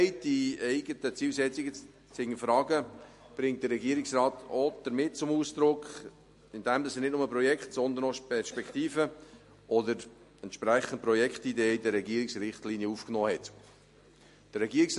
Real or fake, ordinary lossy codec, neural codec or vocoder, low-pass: real; MP3, 48 kbps; none; 14.4 kHz